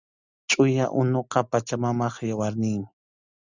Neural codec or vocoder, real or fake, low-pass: none; real; 7.2 kHz